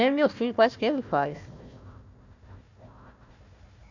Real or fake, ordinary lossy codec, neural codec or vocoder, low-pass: fake; none; codec, 16 kHz, 1 kbps, FunCodec, trained on Chinese and English, 50 frames a second; 7.2 kHz